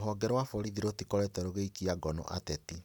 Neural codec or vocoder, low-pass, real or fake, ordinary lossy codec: none; none; real; none